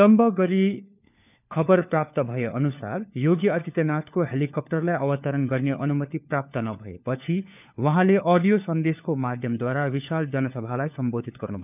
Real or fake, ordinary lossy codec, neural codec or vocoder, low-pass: fake; none; codec, 16 kHz, 4 kbps, FunCodec, trained on LibriTTS, 50 frames a second; 3.6 kHz